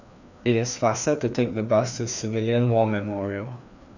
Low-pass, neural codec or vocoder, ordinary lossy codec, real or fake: 7.2 kHz; codec, 16 kHz, 2 kbps, FreqCodec, larger model; none; fake